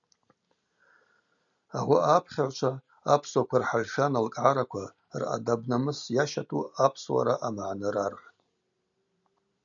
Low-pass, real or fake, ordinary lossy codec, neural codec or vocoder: 7.2 kHz; real; MP3, 96 kbps; none